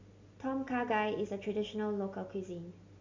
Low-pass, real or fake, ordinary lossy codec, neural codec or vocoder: 7.2 kHz; real; MP3, 48 kbps; none